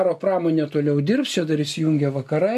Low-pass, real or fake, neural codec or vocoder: 14.4 kHz; real; none